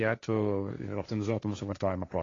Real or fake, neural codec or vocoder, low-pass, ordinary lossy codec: fake; codec, 16 kHz, 1.1 kbps, Voila-Tokenizer; 7.2 kHz; AAC, 32 kbps